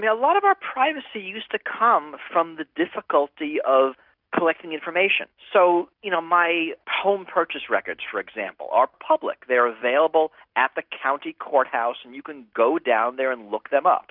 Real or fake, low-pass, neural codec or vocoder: real; 5.4 kHz; none